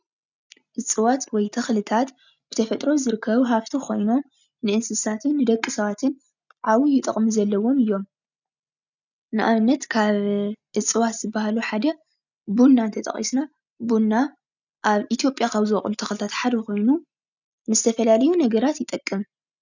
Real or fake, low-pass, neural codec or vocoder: real; 7.2 kHz; none